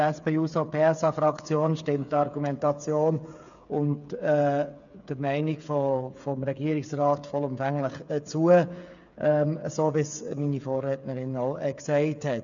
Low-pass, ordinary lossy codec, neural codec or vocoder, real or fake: 7.2 kHz; none; codec, 16 kHz, 8 kbps, FreqCodec, smaller model; fake